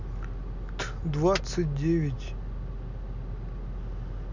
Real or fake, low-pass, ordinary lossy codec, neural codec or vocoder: real; 7.2 kHz; none; none